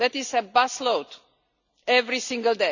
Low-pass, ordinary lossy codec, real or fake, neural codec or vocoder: 7.2 kHz; none; real; none